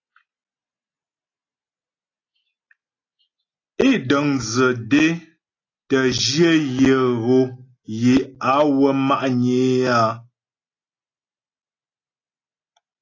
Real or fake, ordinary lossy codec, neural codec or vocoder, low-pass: real; AAC, 32 kbps; none; 7.2 kHz